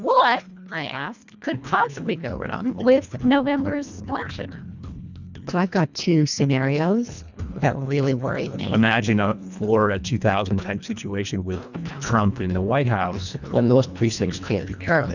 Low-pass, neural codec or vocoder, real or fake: 7.2 kHz; codec, 24 kHz, 1.5 kbps, HILCodec; fake